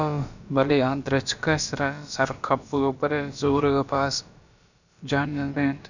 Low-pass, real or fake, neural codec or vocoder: 7.2 kHz; fake; codec, 16 kHz, about 1 kbps, DyCAST, with the encoder's durations